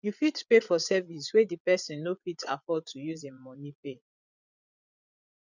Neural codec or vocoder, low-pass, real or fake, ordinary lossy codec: vocoder, 22.05 kHz, 80 mel bands, Vocos; 7.2 kHz; fake; none